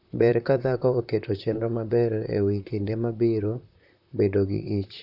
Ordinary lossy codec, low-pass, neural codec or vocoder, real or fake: none; 5.4 kHz; vocoder, 44.1 kHz, 128 mel bands, Pupu-Vocoder; fake